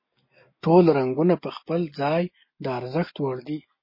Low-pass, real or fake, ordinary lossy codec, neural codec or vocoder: 5.4 kHz; real; MP3, 24 kbps; none